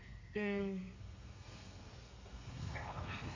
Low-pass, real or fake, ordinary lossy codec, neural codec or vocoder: 7.2 kHz; fake; MP3, 48 kbps; codec, 32 kHz, 1.9 kbps, SNAC